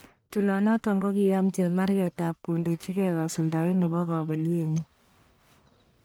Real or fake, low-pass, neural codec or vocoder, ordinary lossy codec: fake; none; codec, 44.1 kHz, 1.7 kbps, Pupu-Codec; none